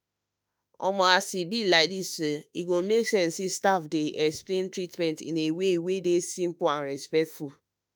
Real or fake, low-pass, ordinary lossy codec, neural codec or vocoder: fake; none; none; autoencoder, 48 kHz, 32 numbers a frame, DAC-VAE, trained on Japanese speech